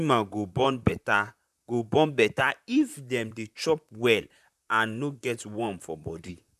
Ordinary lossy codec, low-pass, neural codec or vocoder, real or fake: none; 14.4 kHz; vocoder, 44.1 kHz, 128 mel bands, Pupu-Vocoder; fake